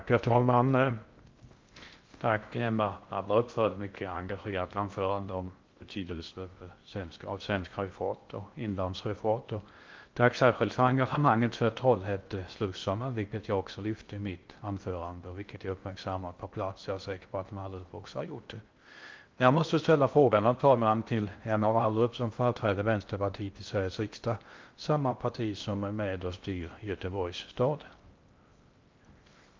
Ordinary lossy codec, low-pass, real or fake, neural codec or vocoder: Opus, 32 kbps; 7.2 kHz; fake; codec, 16 kHz in and 24 kHz out, 0.6 kbps, FocalCodec, streaming, 2048 codes